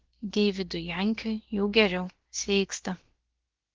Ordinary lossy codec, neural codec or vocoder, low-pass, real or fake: Opus, 16 kbps; codec, 16 kHz, about 1 kbps, DyCAST, with the encoder's durations; 7.2 kHz; fake